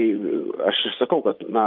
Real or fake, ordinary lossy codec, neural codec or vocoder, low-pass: fake; Opus, 24 kbps; vocoder, 24 kHz, 100 mel bands, Vocos; 5.4 kHz